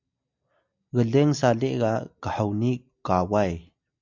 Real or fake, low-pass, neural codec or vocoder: real; 7.2 kHz; none